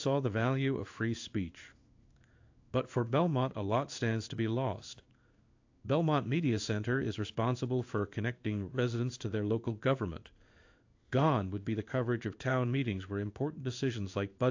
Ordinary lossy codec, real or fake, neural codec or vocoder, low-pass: AAC, 48 kbps; fake; codec, 16 kHz in and 24 kHz out, 1 kbps, XY-Tokenizer; 7.2 kHz